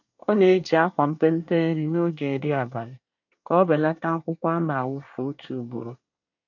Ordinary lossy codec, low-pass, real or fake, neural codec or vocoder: none; 7.2 kHz; fake; codec, 24 kHz, 1 kbps, SNAC